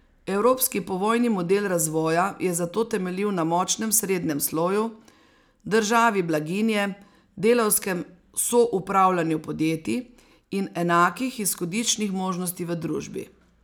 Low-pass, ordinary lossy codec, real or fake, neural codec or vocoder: none; none; real; none